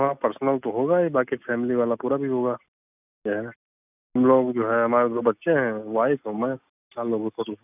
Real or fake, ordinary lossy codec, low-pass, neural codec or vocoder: real; none; 3.6 kHz; none